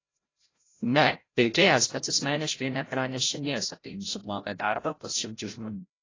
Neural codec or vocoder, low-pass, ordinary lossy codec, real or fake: codec, 16 kHz, 0.5 kbps, FreqCodec, larger model; 7.2 kHz; AAC, 32 kbps; fake